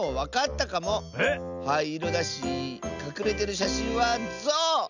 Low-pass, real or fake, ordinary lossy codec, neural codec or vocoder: 7.2 kHz; real; none; none